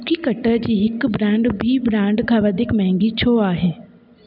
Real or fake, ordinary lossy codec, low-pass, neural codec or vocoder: real; none; 5.4 kHz; none